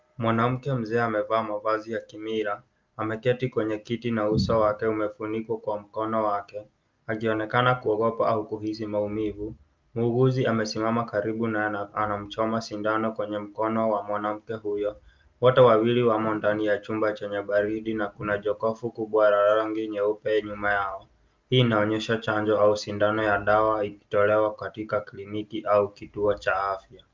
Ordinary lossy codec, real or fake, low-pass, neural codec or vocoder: Opus, 24 kbps; real; 7.2 kHz; none